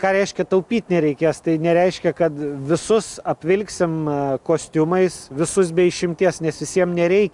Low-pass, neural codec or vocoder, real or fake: 10.8 kHz; none; real